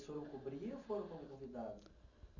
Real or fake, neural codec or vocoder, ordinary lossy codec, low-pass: real; none; none; 7.2 kHz